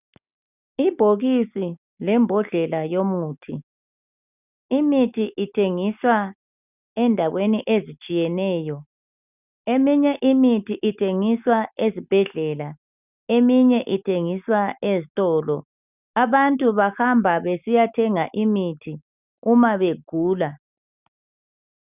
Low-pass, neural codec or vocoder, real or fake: 3.6 kHz; none; real